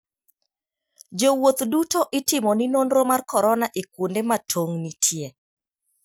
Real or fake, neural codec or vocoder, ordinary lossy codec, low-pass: real; none; none; none